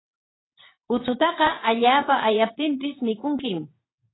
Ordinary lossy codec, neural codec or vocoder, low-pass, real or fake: AAC, 16 kbps; vocoder, 22.05 kHz, 80 mel bands, WaveNeXt; 7.2 kHz; fake